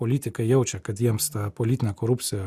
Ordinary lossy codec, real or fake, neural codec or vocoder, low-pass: Opus, 64 kbps; real; none; 14.4 kHz